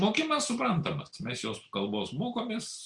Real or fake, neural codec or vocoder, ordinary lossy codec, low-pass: real; none; Opus, 64 kbps; 10.8 kHz